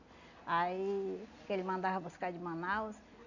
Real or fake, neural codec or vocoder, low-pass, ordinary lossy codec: real; none; 7.2 kHz; none